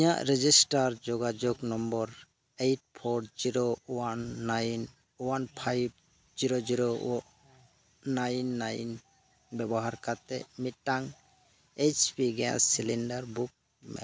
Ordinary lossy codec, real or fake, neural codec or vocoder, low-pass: none; real; none; none